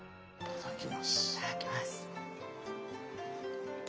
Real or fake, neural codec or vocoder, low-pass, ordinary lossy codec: real; none; none; none